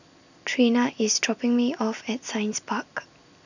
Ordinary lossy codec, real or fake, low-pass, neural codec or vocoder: none; real; 7.2 kHz; none